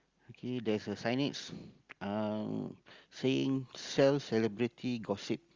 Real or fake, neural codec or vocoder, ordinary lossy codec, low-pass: real; none; Opus, 32 kbps; 7.2 kHz